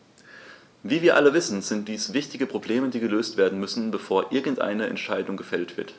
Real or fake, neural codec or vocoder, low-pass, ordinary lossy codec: real; none; none; none